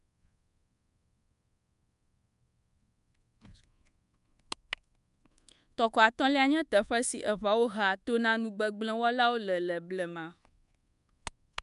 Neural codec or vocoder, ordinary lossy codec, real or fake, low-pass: codec, 24 kHz, 1.2 kbps, DualCodec; none; fake; 10.8 kHz